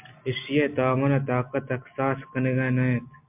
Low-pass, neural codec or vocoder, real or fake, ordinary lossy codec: 3.6 kHz; none; real; MP3, 32 kbps